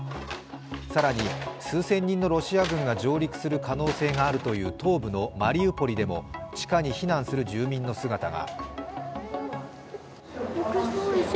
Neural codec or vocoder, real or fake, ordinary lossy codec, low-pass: none; real; none; none